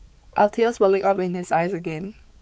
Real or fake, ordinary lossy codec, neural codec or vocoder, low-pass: fake; none; codec, 16 kHz, 4 kbps, X-Codec, HuBERT features, trained on balanced general audio; none